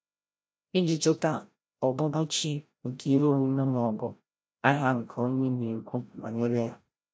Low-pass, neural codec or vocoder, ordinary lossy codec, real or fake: none; codec, 16 kHz, 0.5 kbps, FreqCodec, larger model; none; fake